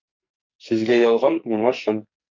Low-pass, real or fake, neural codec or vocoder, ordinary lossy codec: 7.2 kHz; fake; codec, 44.1 kHz, 2.6 kbps, SNAC; MP3, 48 kbps